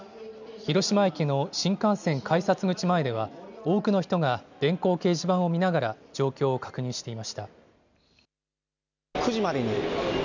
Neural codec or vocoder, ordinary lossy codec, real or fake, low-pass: none; none; real; 7.2 kHz